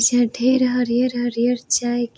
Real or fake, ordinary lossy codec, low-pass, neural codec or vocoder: real; none; none; none